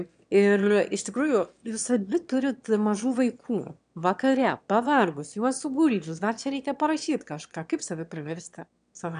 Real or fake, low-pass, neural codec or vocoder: fake; 9.9 kHz; autoencoder, 22.05 kHz, a latent of 192 numbers a frame, VITS, trained on one speaker